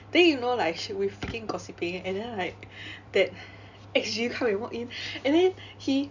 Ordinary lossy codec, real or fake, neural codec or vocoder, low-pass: none; real; none; 7.2 kHz